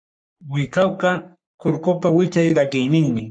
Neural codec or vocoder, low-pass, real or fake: codec, 44.1 kHz, 3.4 kbps, Pupu-Codec; 9.9 kHz; fake